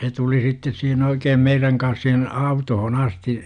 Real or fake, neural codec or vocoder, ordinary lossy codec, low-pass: real; none; none; 9.9 kHz